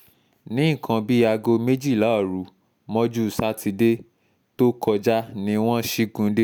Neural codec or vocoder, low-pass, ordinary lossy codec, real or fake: none; none; none; real